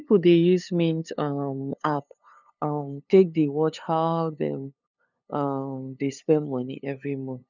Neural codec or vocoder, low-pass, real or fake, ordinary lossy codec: codec, 16 kHz, 2 kbps, FunCodec, trained on LibriTTS, 25 frames a second; 7.2 kHz; fake; none